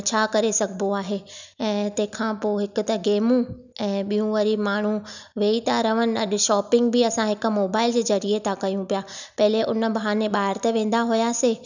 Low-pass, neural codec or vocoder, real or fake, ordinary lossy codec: 7.2 kHz; none; real; none